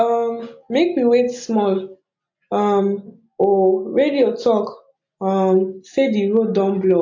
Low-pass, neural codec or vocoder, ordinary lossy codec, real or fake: 7.2 kHz; none; MP3, 48 kbps; real